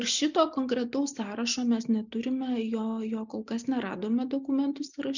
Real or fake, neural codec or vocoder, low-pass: real; none; 7.2 kHz